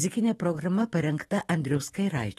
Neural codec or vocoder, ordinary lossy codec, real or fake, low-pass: autoencoder, 48 kHz, 128 numbers a frame, DAC-VAE, trained on Japanese speech; AAC, 32 kbps; fake; 19.8 kHz